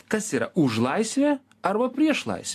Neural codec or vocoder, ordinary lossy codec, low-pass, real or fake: none; AAC, 64 kbps; 14.4 kHz; real